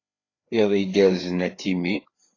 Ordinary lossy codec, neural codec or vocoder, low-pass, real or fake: AAC, 32 kbps; codec, 16 kHz, 4 kbps, FreqCodec, larger model; 7.2 kHz; fake